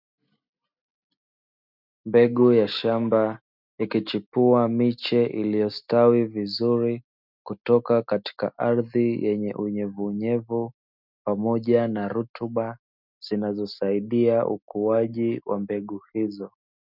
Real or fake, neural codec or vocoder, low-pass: real; none; 5.4 kHz